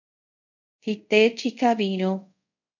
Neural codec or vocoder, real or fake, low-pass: codec, 24 kHz, 0.5 kbps, DualCodec; fake; 7.2 kHz